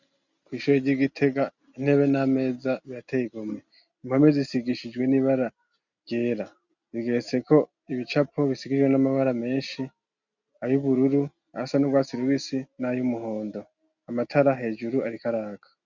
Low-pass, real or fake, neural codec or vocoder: 7.2 kHz; real; none